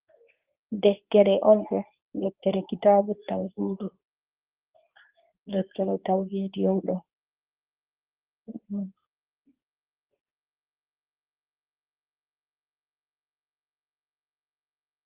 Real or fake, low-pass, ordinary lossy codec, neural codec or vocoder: fake; 3.6 kHz; Opus, 24 kbps; codec, 24 kHz, 0.9 kbps, WavTokenizer, medium speech release version 2